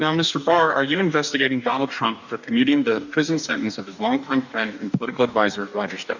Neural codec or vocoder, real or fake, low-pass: codec, 44.1 kHz, 2.6 kbps, DAC; fake; 7.2 kHz